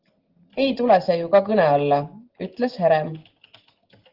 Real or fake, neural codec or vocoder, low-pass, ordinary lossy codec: real; none; 5.4 kHz; Opus, 16 kbps